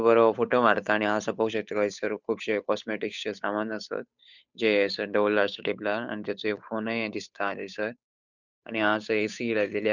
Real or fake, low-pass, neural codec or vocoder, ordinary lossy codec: fake; 7.2 kHz; codec, 16 kHz, 8 kbps, FunCodec, trained on Chinese and English, 25 frames a second; none